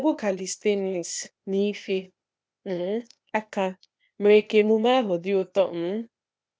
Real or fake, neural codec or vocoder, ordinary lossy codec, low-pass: fake; codec, 16 kHz, 0.8 kbps, ZipCodec; none; none